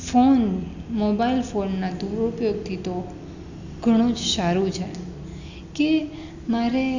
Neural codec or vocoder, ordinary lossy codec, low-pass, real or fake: none; none; 7.2 kHz; real